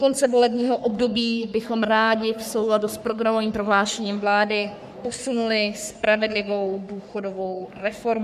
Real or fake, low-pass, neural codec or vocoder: fake; 14.4 kHz; codec, 44.1 kHz, 3.4 kbps, Pupu-Codec